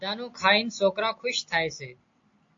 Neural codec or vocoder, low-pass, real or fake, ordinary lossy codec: none; 7.2 kHz; real; AAC, 48 kbps